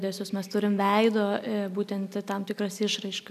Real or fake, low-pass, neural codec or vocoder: real; 14.4 kHz; none